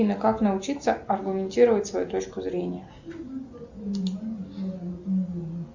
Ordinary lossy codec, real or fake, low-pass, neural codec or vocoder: Opus, 64 kbps; real; 7.2 kHz; none